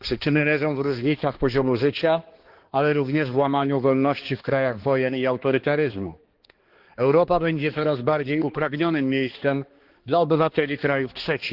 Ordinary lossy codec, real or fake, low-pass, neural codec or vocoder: Opus, 16 kbps; fake; 5.4 kHz; codec, 16 kHz, 2 kbps, X-Codec, HuBERT features, trained on balanced general audio